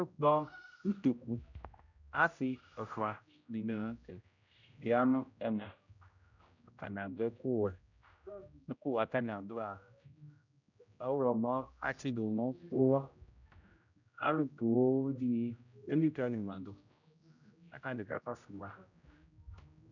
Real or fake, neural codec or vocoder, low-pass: fake; codec, 16 kHz, 0.5 kbps, X-Codec, HuBERT features, trained on general audio; 7.2 kHz